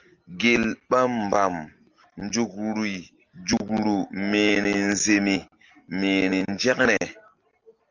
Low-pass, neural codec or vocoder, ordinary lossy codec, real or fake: 7.2 kHz; none; Opus, 32 kbps; real